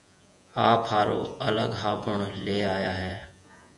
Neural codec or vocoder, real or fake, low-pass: vocoder, 48 kHz, 128 mel bands, Vocos; fake; 10.8 kHz